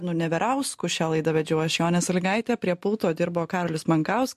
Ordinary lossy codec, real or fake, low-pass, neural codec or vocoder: MP3, 64 kbps; real; 14.4 kHz; none